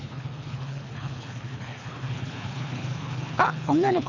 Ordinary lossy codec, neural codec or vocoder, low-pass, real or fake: none; codec, 24 kHz, 3 kbps, HILCodec; 7.2 kHz; fake